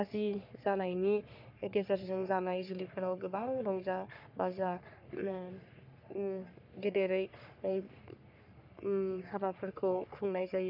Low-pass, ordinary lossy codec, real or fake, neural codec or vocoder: 5.4 kHz; none; fake; codec, 44.1 kHz, 3.4 kbps, Pupu-Codec